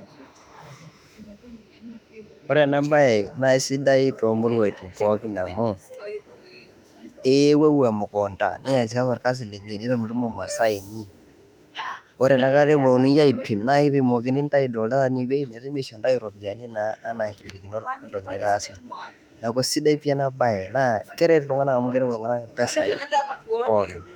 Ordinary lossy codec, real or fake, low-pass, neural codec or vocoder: none; fake; 19.8 kHz; autoencoder, 48 kHz, 32 numbers a frame, DAC-VAE, trained on Japanese speech